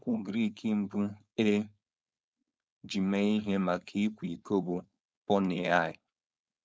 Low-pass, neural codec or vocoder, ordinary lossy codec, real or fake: none; codec, 16 kHz, 4.8 kbps, FACodec; none; fake